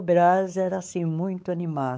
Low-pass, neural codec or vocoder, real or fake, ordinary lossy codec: none; codec, 16 kHz, 4 kbps, X-Codec, WavLM features, trained on Multilingual LibriSpeech; fake; none